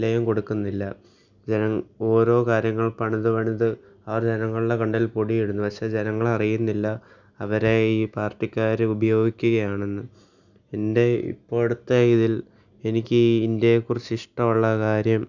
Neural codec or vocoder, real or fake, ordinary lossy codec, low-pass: none; real; none; 7.2 kHz